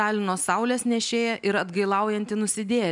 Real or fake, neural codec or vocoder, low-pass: real; none; 10.8 kHz